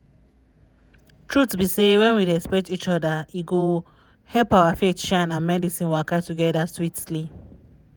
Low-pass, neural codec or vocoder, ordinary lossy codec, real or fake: none; vocoder, 48 kHz, 128 mel bands, Vocos; none; fake